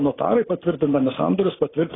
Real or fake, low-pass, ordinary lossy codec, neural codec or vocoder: real; 7.2 kHz; AAC, 16 kbps; none